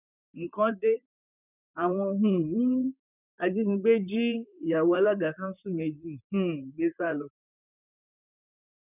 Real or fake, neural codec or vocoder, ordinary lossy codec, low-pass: fake; vocoder, 44.1 kHz, 128 mel bands, Pupu-Vocoder; none; 3.6 kHz